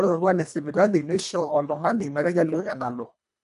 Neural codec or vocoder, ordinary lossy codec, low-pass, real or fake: codec, 24 kHz, 1.5 kbps, HILCodec; none; 10.8 kHz; fake